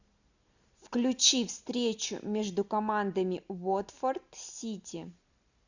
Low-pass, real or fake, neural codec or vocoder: 7.2 kHz; real; none